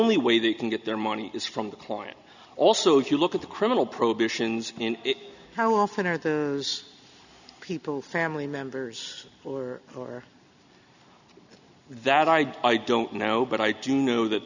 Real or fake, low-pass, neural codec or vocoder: real; 7.2 kHz; none